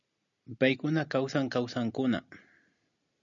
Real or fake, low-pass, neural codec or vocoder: real; 7.2 kHz; none